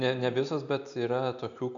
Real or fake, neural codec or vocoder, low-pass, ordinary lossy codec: real; none; 7.2 kHz; AAC, 64 kbps